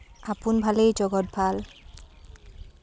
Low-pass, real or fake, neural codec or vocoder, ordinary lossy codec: none; real; none; none